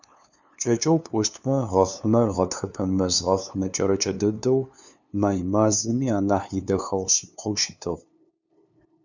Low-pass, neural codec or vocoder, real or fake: 7.2 kHz; codec, 16 kHz, 2 kbps, FunCodec, trained on LibriTTS, 25 frames a second; fake